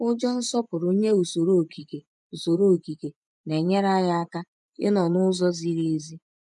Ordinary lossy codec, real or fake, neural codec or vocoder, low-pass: none; fake; vocoder, 24 kHz, 100 mel bands, Vocos; 10.8 kHz